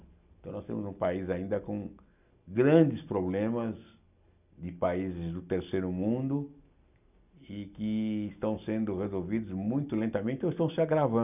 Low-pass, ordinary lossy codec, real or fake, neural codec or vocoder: 3.6 kHz; none; real; none